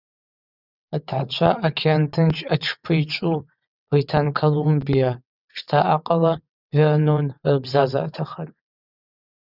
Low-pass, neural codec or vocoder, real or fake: 5.4 kHz; vocoder, 22.05 kHz, 80 mel bands, WaveNeXt; fake